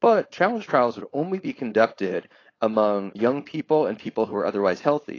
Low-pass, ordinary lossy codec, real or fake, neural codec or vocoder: 7.2 kHz; AAC, 32 kbps; fake; codec, 16 kHz, 4.8 kbps, FACodec